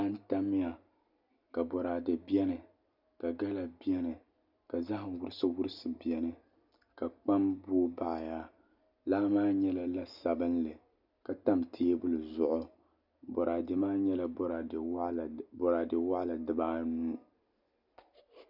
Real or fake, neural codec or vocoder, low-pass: real; none; 5.4 kHz